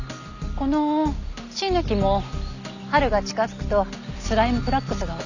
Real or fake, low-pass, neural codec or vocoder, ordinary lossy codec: real; 7.2 kHz; none; none